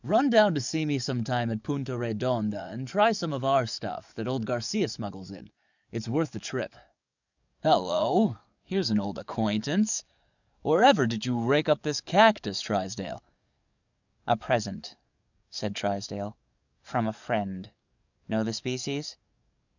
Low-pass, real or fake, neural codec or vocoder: 7.2 kHz; fake; codec, 44.1 kHz, 7.8 kbps, DAC